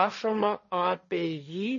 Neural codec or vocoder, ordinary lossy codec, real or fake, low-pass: codec, 16 kHz, 1.1 kbps, Voila-Tokenizer; MP3, 32 kbps; fake; 7.2 kHz